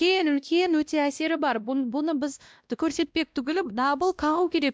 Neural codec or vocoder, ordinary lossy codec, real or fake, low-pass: codec, 16 kHz, 1 kbps, X-Codec, WavLM features, trained on Multilingual LibriSpeech; none; fake; none